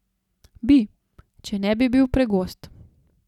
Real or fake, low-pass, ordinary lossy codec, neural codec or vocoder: real; 19.8 kHz; none; none